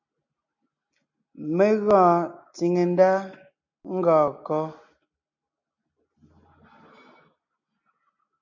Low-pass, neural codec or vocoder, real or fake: 7.2 kHz; none; real